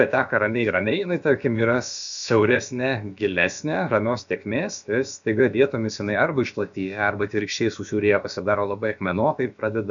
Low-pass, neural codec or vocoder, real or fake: 7.2 kHz; codec, 16 kHz, about 1 kbps, DyCAST, with the encoder's durations; fake